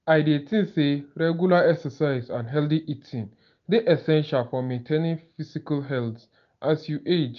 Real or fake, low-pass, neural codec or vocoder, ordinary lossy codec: real; 7.2 kHz; none; none